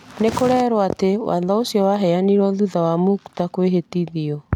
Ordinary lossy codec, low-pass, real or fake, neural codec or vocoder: none; 19.8 kHz; real; none